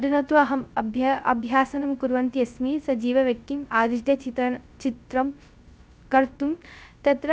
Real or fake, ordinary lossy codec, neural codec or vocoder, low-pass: fake; none; codec, 16 kHz, 0.3 kbps, FocalCodec; none